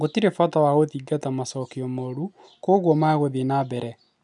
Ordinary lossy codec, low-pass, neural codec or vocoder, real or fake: none; 10.8 kHz; none; real